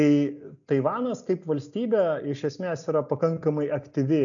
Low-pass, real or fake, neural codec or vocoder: 7.2 kHz; real; none